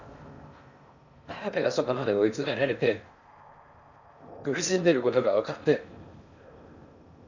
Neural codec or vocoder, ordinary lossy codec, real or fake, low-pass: codec, 16 kHz in and 24 kHz out, 0.6 kbps, FocalCodec, streaming, 4096 codes; none; fake; 7.2 kHz